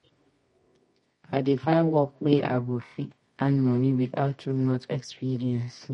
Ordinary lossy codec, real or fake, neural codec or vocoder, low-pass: MP3, 48 kbps; fake; codec, 24 kHz, 0.9 kbps, WavTokenizer, medium music audio release; 10.8 kHz